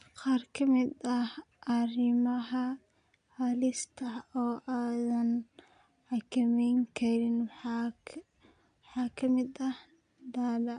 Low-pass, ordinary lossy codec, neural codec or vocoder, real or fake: 9.9 kHz; none; none; real